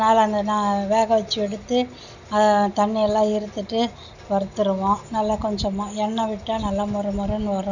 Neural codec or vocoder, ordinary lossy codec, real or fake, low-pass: none; none; real; 7.2 kHz